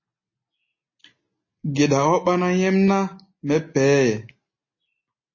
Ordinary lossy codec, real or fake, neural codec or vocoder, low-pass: MP3, 32 kbps; real; none; 7.2 kHz